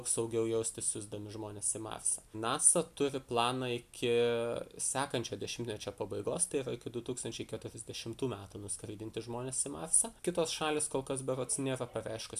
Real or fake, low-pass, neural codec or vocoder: real; 14.4 kHz; none